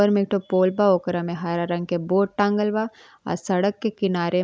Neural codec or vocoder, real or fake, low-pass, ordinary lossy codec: none; real; none; none